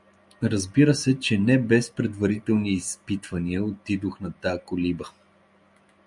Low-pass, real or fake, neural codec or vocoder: 10.8 kHz; real; none